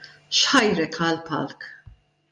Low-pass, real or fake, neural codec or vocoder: 10.8 kHz; real; none